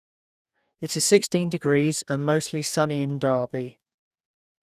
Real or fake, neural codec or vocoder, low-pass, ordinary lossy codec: fake; codec, 44.1 kHz, 2.6 kbps, DAC; 14.4 kHz; none